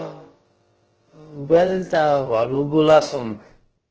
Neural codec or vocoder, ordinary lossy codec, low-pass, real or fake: codec, 16 kHz, about 1 kbps, DyCAST, with the encoder's durations; Opus, 16 kbps; 7.2 kHz; fake